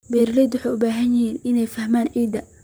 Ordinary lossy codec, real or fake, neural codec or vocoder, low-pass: none; fake; vocoder, 44.1 kHz, 128 mel bands, Pupu-Vocoder; none